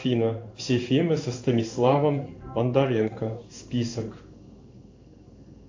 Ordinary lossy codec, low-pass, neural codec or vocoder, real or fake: AAC, 48 kbps; 7.2 kHz; codec, 16 kHz in and 24 kHz out, 1 kbps, XY-Tokenizer; fake